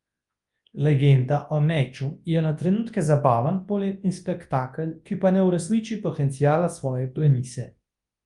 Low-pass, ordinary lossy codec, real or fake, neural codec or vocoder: 10.8 kHz; Opus, 32 kbps; fake; codec, 24 kHz, 0.9 kbps, WavTokenizer, large speech release